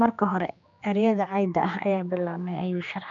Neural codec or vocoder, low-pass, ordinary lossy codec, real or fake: codec, 16 kHz, 2 kbps, X-Codec, HuBERT features, trained on general audio; 7.2 kHz; none; fake